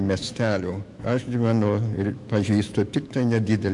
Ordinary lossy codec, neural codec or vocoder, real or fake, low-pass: MP3, 64 kbps; autoencoder, 48 kHz, 128 numbers a frame, DAC-VAE, trained on Japanese speech; fake; 10.8 kHz